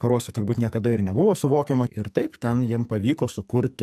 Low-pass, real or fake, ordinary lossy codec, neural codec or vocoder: 14.4 kHz; fake; AAC, 96 kbps; codec, 44.1 kHz, 2.6 kbps, SNAC